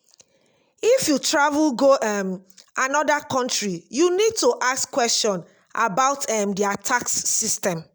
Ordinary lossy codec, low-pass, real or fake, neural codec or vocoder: none; none; real; none